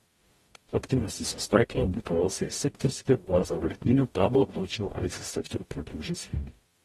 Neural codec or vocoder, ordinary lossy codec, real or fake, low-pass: codec, 44.1 kHz, 0.9 kbps, DAC; AAC, 32 kbps; fake; 19.8 kHz